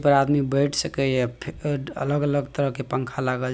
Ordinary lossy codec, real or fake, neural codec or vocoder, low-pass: none; real; none; none